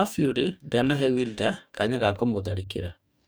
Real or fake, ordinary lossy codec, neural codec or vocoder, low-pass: fake; none; codec, 44.1 kHz, 2.6 kbps, DAC; none